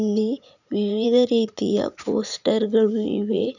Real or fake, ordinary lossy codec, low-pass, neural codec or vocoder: fake; none; 7.2 kHz; vocoder, 44.1 kHz, 80 mel bands, Vocos